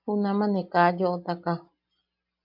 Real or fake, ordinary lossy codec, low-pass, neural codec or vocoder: real; MP3, 48 kbps; 5.4 kHz; none